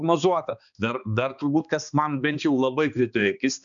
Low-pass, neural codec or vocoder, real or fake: 7.2 kHz; codec, 16 kHz, 2 kbps, X-Codec, HuBERT features, trained on balanced general audio; fake